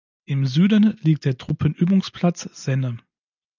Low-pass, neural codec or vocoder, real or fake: 7.2 kHz; none; real